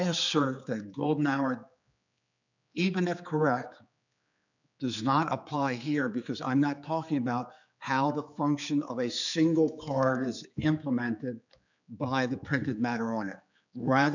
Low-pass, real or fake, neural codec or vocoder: 7.2 kHz; fake; codec, 16 kHz, 4 kbps, X-Codec, HuBERT features, trained on general audio